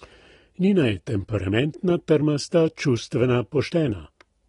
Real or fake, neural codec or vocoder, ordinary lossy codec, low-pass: real; none; AAC, 32 kbps; 10.8 kHz